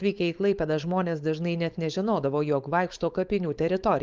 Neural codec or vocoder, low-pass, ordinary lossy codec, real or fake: codec, 16 kHz, 4.8 kbps, FACodec; 7.2 kHz; Opus, 24 kbps; fake